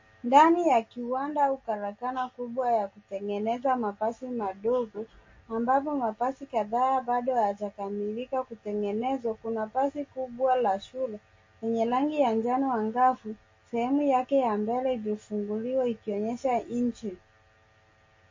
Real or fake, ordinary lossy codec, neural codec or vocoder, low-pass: real; MP3, 32 kbps; none; 7.2 kHz